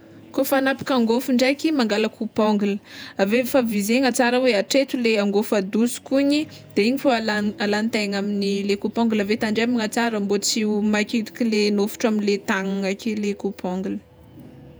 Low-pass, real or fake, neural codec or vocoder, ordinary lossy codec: none; fake; vocoder, 48 kHz, 128 mel bands, Vocos; none